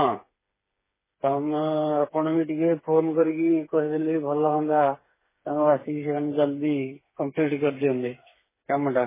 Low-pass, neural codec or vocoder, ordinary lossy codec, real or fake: 3.6 kHz; codec, 16 kHz, 4 kbps, FreqCodec, smaller model; MP3, 16 kbps; fake